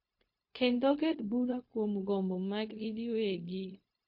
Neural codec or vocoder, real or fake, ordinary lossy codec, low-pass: codec, 16 kHz, 0.4 kbps, LongCat-Audio-Codec; fake; MP3, 32 kbps; 5.4 kHz